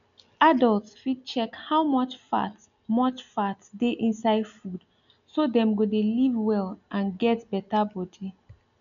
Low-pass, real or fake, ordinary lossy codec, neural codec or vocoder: 7.2 kHz; real; none; none